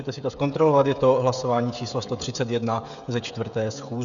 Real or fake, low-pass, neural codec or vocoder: fake; 7.2 kHz; codec, 16 kHz, 16 kbps, FreqCodec, smaller model